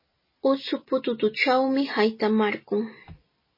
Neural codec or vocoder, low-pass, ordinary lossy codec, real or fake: none; 5.4 kHz; MP3, 24 kbps; real